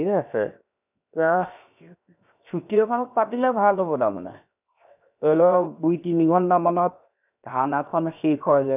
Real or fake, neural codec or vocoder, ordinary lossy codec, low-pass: fake; codec, 16 kHz, 0.7 kbps, FocalCodec; none; 3.6 kHz